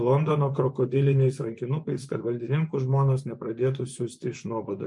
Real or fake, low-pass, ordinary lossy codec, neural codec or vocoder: fake; 10.8 kHz; MP3, 64 kbps; vocoder, 24 kHz, 100 mel bands, Vocos